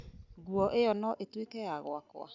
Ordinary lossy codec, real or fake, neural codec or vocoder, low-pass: none; real; none; 7.2 kHz